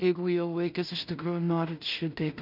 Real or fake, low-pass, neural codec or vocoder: fake; 5.4 kHz; codec, 16 kHz in and 24 kHz out, 0.4 kbps, LongCat-Audio-Codec, two codebook decoder